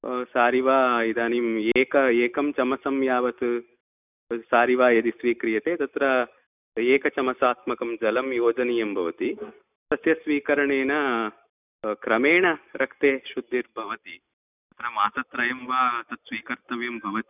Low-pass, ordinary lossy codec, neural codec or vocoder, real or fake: 3.6 kHz; none; none; real